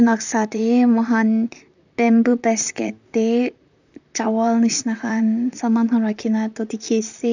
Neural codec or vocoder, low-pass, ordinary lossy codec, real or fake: vocoder, 44.1 kHz, 128 mel bands, Pupu-Vocoder; 7.2 kHz; none; fake